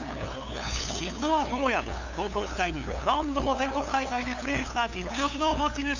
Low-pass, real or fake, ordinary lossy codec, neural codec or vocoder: 7.2 kHz; fake; none; codec, 16 kHz, 2 kbps, FunCodec, trained on LibriTTS, 25 frames a second